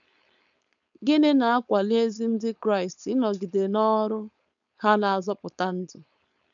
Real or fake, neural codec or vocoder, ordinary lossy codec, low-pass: fake; codec, 16 kHz, 4.8 kbps, FACodec; none; 7.2 kHz